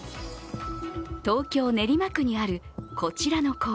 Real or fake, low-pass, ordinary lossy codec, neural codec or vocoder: real; none; none; none